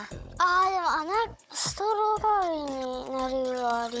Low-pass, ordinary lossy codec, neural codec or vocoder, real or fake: none; none; codec, 16 kHz, 16 kbps, FunCodec, trained on Chinese and English, 50 frames a second; fake